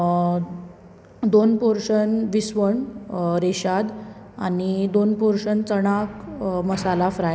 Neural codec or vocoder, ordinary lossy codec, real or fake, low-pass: none; none; real; none